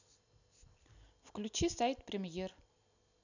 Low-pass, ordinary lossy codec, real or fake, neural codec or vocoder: 7.2 kHz; none; real; none